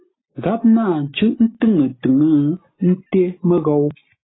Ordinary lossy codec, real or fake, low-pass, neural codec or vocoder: AAC, 16 kbps; real; 7.2 kHz; none